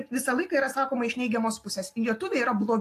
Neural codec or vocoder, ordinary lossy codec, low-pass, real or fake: none; AAC, 64 kbps; 14.4 kHz; real